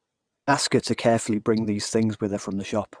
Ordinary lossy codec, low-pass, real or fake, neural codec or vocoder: Opus, 64 kbps; 9.9 kHz; fake; vocoder, 22.05 kHz, 80 mel bands, WaveNeXt